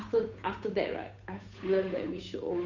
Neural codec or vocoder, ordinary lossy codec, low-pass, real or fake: codec, 16 kHz, 8 kbps, FunCodec, trained on Chinese and English, 25 frames a second; none; 7.2 kHz; fake